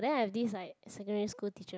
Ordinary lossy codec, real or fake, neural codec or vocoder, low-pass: none; real; none; none